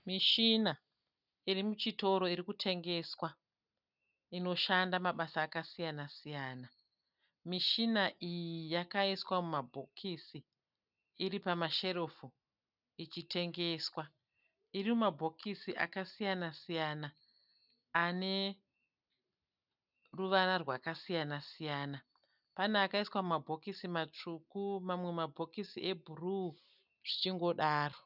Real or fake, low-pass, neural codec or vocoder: real; 5.4 kHz; none